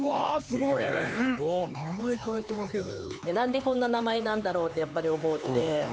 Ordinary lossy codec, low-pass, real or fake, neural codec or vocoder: none; none; fake; codec, 16 kHz, 4 kbps, X-Codec, HuBERT features, trained on LibriSpeech